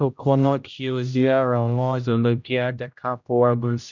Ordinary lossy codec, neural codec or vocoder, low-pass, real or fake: none; codec, 16 kHz, 0.5 kbps, X-Codec, HuBERT features, trained on general audio; 7.2 kHz; fake